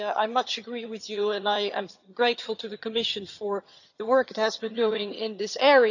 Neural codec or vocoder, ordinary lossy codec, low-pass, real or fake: vocoder, 22.05 kHz, 80 mel bands, HiFi-GAN; none; 7.2 kHz; fake